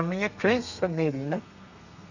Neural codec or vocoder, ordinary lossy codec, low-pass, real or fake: codec, 32 kHz, 1.9 kbps, SNAC; none; 7.2 kHz; fake